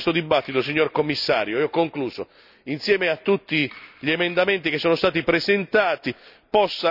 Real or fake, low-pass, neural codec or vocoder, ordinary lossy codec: real; 5.4 kHz; none; none